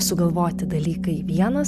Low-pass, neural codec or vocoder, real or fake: 14.4 kHz; none; real